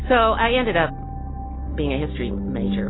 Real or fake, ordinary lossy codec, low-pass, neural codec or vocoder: real; AAC, 16 kbps; 7.2 kHz; none